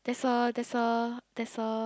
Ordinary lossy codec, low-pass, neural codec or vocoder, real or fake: none; none; none; real